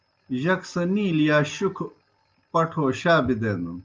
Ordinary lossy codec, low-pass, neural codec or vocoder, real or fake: Opus, 24 kbps; 7.2 kHz; none; real